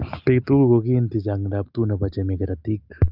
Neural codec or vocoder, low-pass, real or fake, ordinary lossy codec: none; 5.4 kHz; real; Opus, 24 kbps